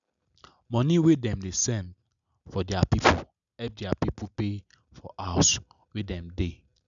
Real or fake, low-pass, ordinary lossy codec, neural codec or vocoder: real; 7.2 kHz; none; none